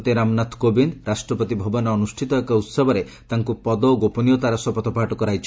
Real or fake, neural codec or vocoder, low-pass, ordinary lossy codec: real; none; none; none